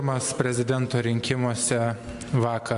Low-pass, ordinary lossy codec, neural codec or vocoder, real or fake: 10.8 kHz; AAC, 64 kbps; none; real